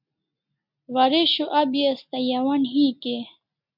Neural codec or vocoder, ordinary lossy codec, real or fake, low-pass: none; MP3, 48 kbps; real; 5.4 kHz